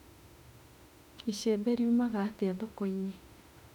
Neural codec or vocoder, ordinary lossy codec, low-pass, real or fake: autoencoder, 48 kHz, 32 numbers a frame, DAC-VAE, trained on Japanese speech; none; 19.8 kHz; fake